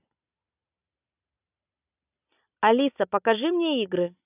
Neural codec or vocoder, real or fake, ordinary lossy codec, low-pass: none; real; none; 3.6 kHz